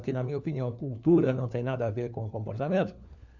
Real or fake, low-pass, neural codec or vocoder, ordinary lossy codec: fake; 7.2 kHz; codec, 16 kHz, 4 kbps, FunCodec, trained on LibriTTS, 50 frames a second; none